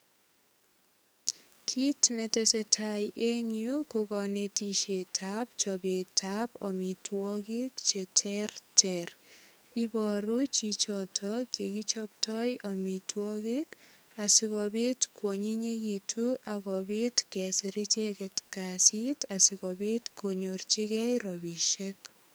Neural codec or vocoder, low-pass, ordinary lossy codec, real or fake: codec, 44.1 kHz, 2.6 kbps, SNAC; none; none; fake